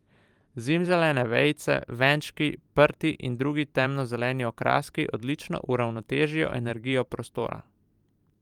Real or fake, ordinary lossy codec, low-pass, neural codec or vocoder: fake; Opus, 32 kbps; 19.8 kHz; vocoder, 44.1 kHz, 128 mel bands every 512 samples, BigVGAN v2